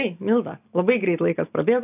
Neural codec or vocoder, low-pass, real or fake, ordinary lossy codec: none; 3.6 kHz; real; AAC, 32 kbps